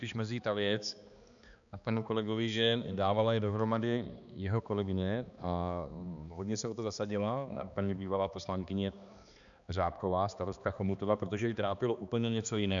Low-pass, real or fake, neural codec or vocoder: 7.2 kHz; fake; codec, 16 kHz, 2 kbps, X-Codec, HuBERT features, trained on balanced general audio